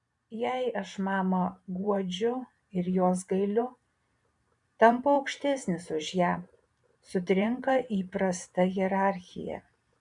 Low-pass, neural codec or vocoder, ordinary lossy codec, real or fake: 10.8 kHz; vocoder, 44.1 kHz, 128 mel bands every 512 samples, BigVGAN v2; MP3, 96 kbps; fake